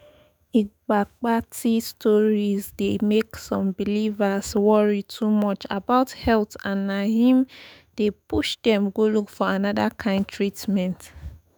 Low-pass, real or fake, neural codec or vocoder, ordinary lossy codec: none; fake; autoencoder, 48 kHz, 128 numbers a frame, DAC-VAE, trained on Japanese speech; none